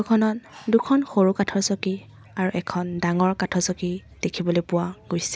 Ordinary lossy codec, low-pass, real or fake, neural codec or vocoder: none; none; real; none